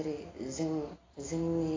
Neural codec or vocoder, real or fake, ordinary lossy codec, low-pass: none; real; AAC, 32 kbps; 7.2 kHz